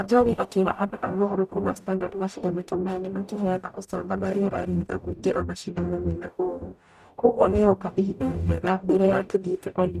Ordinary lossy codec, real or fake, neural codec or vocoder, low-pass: none; fake; codec, 44.1 kHz, 0.9 kbps, DAC; 14.4 kHz